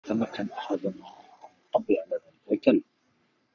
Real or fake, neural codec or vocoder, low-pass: fake; codec, 44.1 kHz, 3.4 kbps, Pupu-Codec; 7.2 kHz